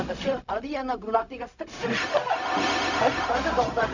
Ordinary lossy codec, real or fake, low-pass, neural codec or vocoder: none; fake; 7.2 kHz; codec, 16 kHz, 0.4 kbps, LongCat-Audio-Codec